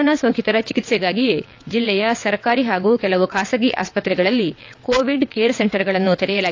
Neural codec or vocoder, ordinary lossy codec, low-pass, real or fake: vocoder, 22.05 kHz, 80 mel bands, WaveNeXt; AAC, 48 kbps; 7.2 kHz; fake